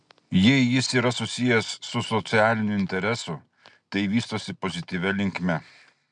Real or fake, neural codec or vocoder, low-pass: real; none; 9.9 kHz